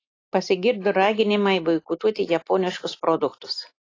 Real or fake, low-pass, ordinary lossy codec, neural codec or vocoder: real; 7.2 kHz; AAC, 32 kbps; none